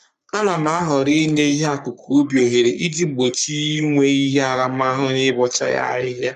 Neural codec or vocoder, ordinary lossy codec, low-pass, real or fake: codec, 44.1 kHz, 3.4 kbps, Pupu-Codec; MP3, 96 kbps; 14.4 kHz; fake